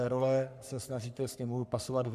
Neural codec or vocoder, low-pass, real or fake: codec, 44.1 kHz, 3.4 kbps, Pupu-Codec; 14.4 kHz; fake